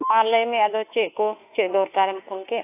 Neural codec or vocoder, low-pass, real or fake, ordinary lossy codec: autoencoder, 48 kHz, 32 numbers a frame, DAC-VAE, trained on Japanese speech; 3.6 kHz; fake; AAC, 24 kbps